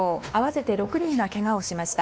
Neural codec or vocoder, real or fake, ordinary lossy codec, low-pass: codec, 16 kHz, 2 kbps, X-Codec, WavLM features, trained on Multilingual LibriSpeech; fake; none; none